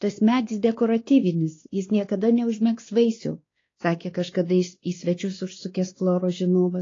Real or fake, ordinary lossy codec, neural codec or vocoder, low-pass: fake; AAC, 32 kbps; codec, 16 kHz, 2 kbps, X-Codec, WavLM features, trained on Multilingual LibriSpeech; 7.2 kHz